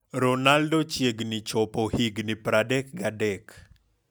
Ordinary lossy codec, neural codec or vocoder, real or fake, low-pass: none; none; real; none